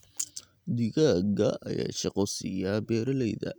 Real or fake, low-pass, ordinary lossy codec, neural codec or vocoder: real; none; none; none